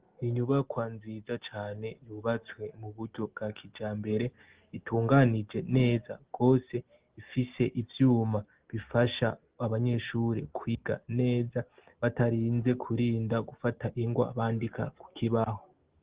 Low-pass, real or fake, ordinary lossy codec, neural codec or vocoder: 3.6 kHz; real; Opus, 16 kbps; none